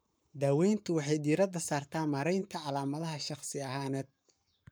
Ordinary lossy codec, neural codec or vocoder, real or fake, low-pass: none; codec, 44.1 kHz, 7.8 kbps, Pupu-Codec; fake; none